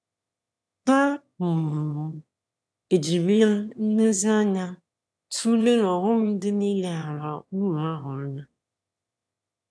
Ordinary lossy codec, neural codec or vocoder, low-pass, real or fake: none; autoencoder, 22.05 kHz, a latent of 192 numbers a frame, VITS, trained on one speaker; none; fake